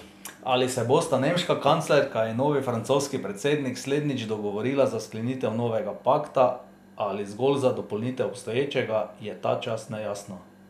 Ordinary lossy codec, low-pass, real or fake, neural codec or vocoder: none; 14.4 kHz; real; none